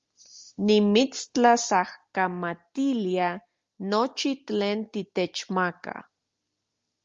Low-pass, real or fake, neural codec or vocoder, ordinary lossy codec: 7.2 kHz; real; none; Opus, 32 kbps